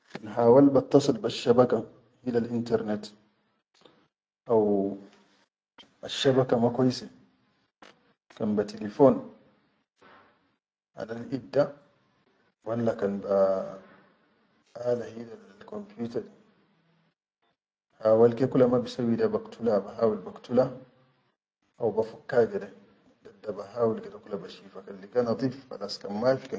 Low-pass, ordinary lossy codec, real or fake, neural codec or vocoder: none; none; real; none